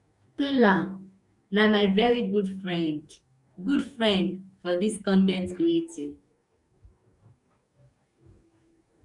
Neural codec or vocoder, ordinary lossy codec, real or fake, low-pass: codec, 44.1 kHz, 2.6 kbps, DAC; none; fake; 10.8 kHz